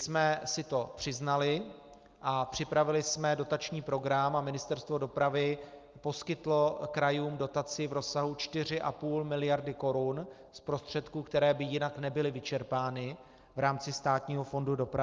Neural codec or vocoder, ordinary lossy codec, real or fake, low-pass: none; Opus, 24 kbps; real; 7.2 kHz